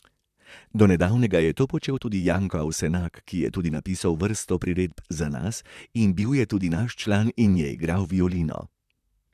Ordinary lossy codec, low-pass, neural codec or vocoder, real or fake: none; 14.4 kHz; vocoder, 44.1 kHz, 128 mel bands, Pupu-Vocoder; fake